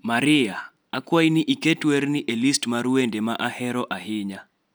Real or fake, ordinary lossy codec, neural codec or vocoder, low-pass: real; none; none; none